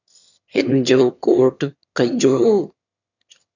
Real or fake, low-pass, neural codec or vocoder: fake; 7.2 kHz; autoencoder, 22.05 kHz, a latent of 192 numbers a frame, VITS, trained on one speaker